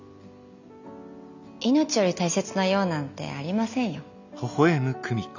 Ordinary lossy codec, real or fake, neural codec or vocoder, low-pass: none; real; none; 7.2 kHz